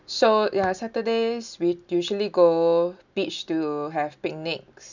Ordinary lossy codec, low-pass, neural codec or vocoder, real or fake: none; 7.2 kHz; none; real